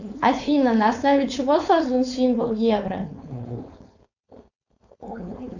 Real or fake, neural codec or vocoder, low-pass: fake; codec, 16 kHz, 4.8 kbps, FACodec; 7.2 kHz